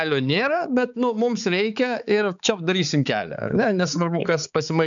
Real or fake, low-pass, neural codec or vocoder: fake; 7.2 kHz; codec, 16 kHz, 4 kbps, X-Codec, HuBERT features, trained on balanced general audio